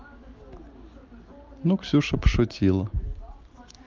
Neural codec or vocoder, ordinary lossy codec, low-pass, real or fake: none; Opus, 32 kbps; 7.2 kHz; real